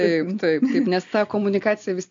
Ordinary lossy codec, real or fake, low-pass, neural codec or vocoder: AAC, 64 kbps; real; 7.2 kHz; none